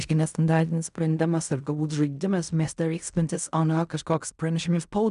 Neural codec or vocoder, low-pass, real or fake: codec, 16 kHz in and 24 kHz out, 0.4 kbps, LongCat-Audio-Codec, fine tuned four codebook decoder; 10.8 kHz; fake